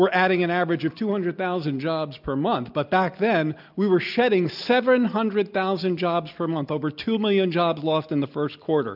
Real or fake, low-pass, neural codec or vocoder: real; 5.4 kHz; none